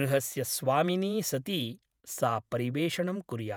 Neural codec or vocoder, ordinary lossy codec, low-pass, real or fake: vocoder, 48 kHz, 128 mel bands, Vocos; none; none; fake